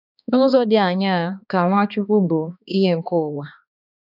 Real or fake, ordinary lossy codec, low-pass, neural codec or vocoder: fake; none; 5.4 kHz; codec, 16 kHz, 2 kbps, X-Codec, HuBERT features, trained on balanced general audio